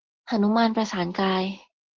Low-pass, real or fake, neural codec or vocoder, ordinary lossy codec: 7.2 kHz; real; none; Opus, 16 kbps